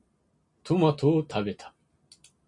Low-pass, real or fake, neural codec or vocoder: 10.8 kHz; real; none